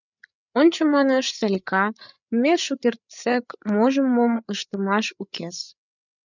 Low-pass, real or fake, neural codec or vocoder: 7.2 kHz; fake; codec, 16 kHz, 8 kbps, FreqCodec, larger model